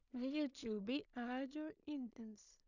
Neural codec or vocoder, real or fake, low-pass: codec, 16 kHz in and 24 kHz out, 0.4 kbps, LongCat-Audio-Codec, two codebook decoder; fake; 7.2 kHz